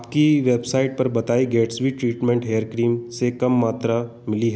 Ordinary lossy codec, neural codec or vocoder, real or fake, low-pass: none; none; real; none